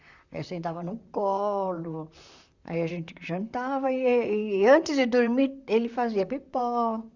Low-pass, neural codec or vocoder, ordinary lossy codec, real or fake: 7.2 kHz; vocoder, 44.1 kHz, 128 mel bands, Pupu-Vocoder; Opus, 64 kbps; fake